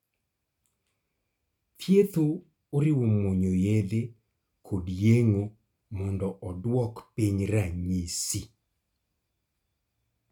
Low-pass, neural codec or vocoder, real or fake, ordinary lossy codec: 19.8 kHz; none; real; none